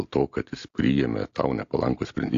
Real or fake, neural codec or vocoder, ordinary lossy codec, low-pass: real; none; AAC, 48 kbps; 7.2 kHz